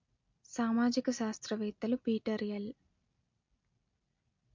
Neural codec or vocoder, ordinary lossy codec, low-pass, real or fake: none; MP3, 48 kbps; 7.2 kHz; real